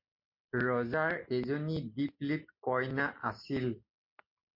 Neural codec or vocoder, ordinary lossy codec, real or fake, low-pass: none; MP3, 32 kbps; real; 5.4 kHz